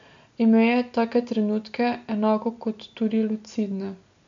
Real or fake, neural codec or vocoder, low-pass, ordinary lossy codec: real; none; 7.2 kHz; MP3, 64 kbps